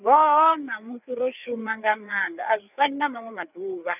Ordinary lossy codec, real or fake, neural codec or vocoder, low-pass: none; fake; codec, 16 kHz, 4 kbps, FreqCodec, larger model; 3.6 kHz